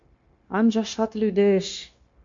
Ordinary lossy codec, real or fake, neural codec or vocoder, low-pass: MP3, 48 kbps; fake; codec, 16 kHz, 0.9 kbps, LongCat-Audio-Codec; 7.2 kHz